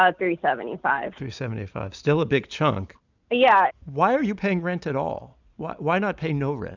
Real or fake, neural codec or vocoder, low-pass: real; none; 7.2 kHz